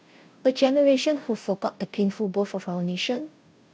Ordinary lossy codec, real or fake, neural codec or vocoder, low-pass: none; fake; codec, 16 kHz, 0.5 kbps, FunCodec, trained on Chinese and English, 25 frames a second; none